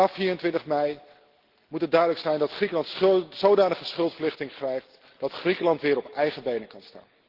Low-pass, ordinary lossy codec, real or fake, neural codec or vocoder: 5.4 kHz; Opus, 16 kbps; real; none